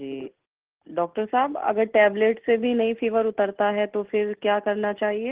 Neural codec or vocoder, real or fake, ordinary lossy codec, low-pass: none; real; Opus, 16 kbps; 3.6 kHz